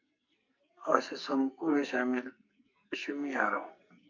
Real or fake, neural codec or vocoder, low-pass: fake; codec, 44.1 kHz, 2.6 kbps, SNAC; 7.2 kHz